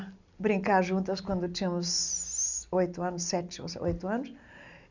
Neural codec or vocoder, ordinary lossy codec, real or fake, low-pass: none; none; real; 7.2 kHz